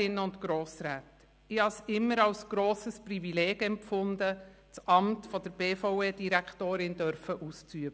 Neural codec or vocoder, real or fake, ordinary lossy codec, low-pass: none; real; none; none